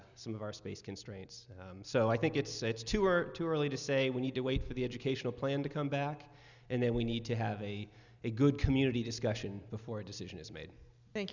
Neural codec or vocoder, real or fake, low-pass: none; real; 7.2 kHz